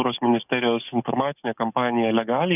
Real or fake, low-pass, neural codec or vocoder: fake; 3.6 kHz; codec, 44.1 kHz, 7.8 kbps, DAC